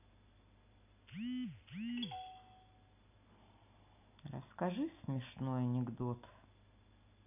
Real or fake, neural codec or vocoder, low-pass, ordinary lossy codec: real; none; 3.6 kHz; none